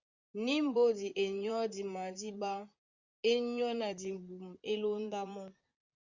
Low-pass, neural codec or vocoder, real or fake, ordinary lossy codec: 7.2 kHz; vocoder, 44.1 kHz, 128 mel bands, Pupu-Vocoder; fake; Opus, 64 kbps